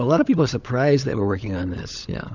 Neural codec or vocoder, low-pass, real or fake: codec, 16 kHz, 16 kbps, FunCodec, trained on LibriTTS, 50 frames a second; 7.2 kHz; fake